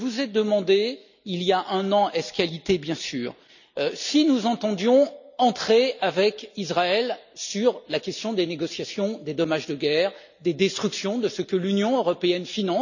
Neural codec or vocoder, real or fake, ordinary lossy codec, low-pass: none; real; none; 7.2 kHz